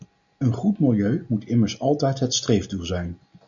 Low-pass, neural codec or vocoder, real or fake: 7.2 kHz; none; real